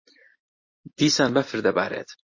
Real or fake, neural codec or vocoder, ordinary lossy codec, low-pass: real; none; MP3, 32 kbps; 7.2 kHz